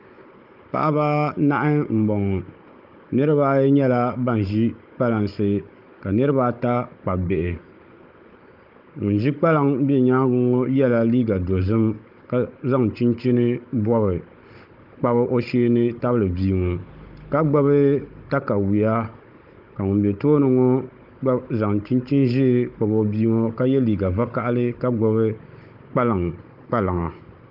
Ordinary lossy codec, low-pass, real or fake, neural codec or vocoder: Opus, 32 kbps; 5.4 kHz; fake; codec, 16 kHz, 16 kbps, FunCodec, trained on Chinese and English, 50 frames a second